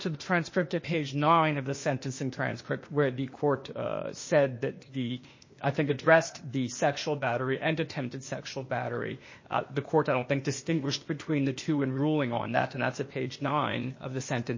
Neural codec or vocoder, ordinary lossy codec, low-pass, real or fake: codec, 16 kHz, 0.8 kbps, ZipCodec; MP3, 32 kbps; 7.2 kHz; fake